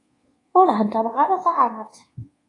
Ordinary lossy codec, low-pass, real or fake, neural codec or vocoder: AAC, 32 kbps; 10.8 kHz; fake; codec, 24 kHz, 1.2 kbps, DualCodec